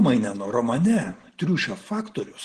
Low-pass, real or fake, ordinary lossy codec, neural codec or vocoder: 9.9 kHz; real; Opus, 16 kbps; none